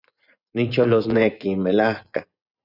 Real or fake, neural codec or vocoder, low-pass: fake; vocoder, 24 kHz, 100 mel bands, Vocos; 5.4 kHz